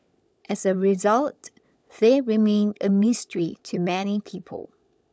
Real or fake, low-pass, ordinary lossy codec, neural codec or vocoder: fake; none; none; codec, 16 kHz, 16 kbps, FunCodec, trained on LibriTTS, 50 frames a second